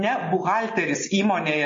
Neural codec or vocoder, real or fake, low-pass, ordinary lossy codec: none; real; 7.2 kHz; MP3, 32 kbps